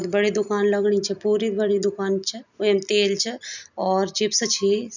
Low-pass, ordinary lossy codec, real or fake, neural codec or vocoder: 7.2 kHz; none; real; none